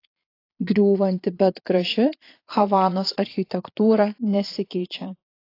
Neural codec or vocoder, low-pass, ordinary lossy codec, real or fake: vocoder, 22.05 kHz, 80 mel bands, Vocos; 5.4 kHz; AAC, 32 kbps; fake